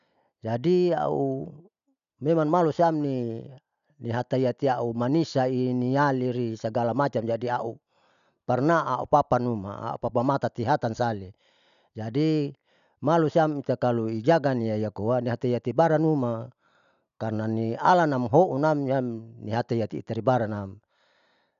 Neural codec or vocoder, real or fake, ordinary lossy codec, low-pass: none; real; none; 7.2 kHz